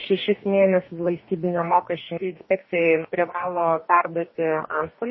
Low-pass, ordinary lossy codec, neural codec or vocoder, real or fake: 7.2 kHz; MP3, 24 kbps; codec, 44.1 kHz, 2.6 kbps, DAC; fake